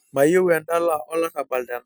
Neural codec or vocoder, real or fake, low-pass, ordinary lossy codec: none; real; none; none